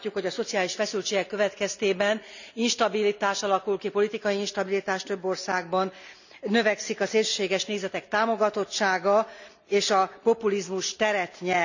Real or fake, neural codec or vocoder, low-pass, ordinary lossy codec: real; none; 7.2 kHz; none